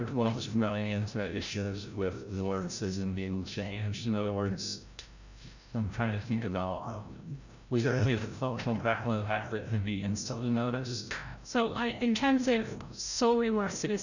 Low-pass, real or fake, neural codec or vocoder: 7.2 kHz; fake; codec, 16 kHz, 0.5 kbps, FreqCodec, larger model